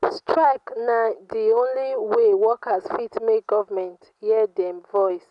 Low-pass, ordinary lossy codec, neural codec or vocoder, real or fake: 10.8 kHz; none; none; real